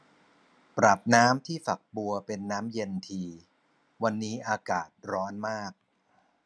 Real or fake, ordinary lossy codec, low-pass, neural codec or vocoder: real; none; none; none